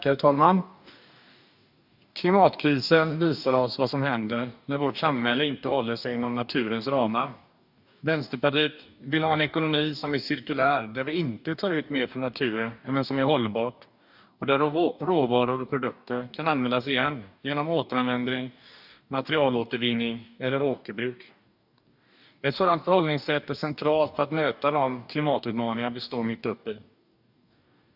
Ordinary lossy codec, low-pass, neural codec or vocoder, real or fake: none; 5.4 kHz; codec, 44.1 kHz, 2.6 kbps, DAC; fake